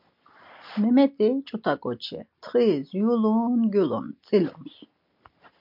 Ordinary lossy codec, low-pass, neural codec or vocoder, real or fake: MP3, 48 kbps; 5.4 kHz; none; real